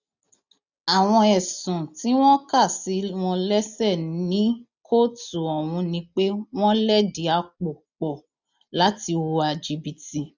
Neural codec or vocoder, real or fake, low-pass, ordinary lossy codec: none; real; 7.2 kHz; none